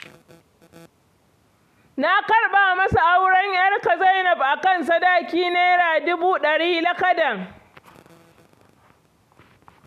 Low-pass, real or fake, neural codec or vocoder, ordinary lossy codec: 14.4 kHz; real; none; AAC, 96 kbps